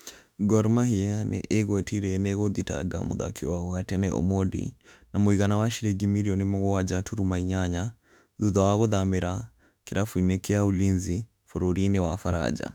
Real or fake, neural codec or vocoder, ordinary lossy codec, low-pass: fake; autoencoder, 48 kHz, 32 numbers a frame, DAC-VAE, trained on Japanese speech; none; 19.8 kHz